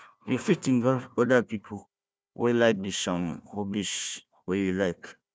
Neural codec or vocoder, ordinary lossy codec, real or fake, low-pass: codec, 16 kHz, 1 kbps, FunCodec, trained on Chinese and English, 50 frames a second; none; fake; none